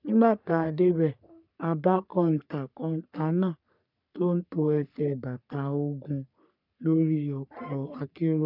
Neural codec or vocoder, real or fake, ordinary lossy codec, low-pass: codec, 44.1 kHz, 3.4 kbps, Pupu-Codec; fake; none; 5.4 kHz